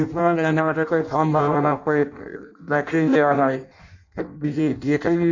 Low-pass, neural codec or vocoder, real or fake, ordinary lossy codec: 7.2 kHz; codec, 16 kHz in and 24 kHz out, 0.6 kbps, FireRedTTS-2 codec; fake; none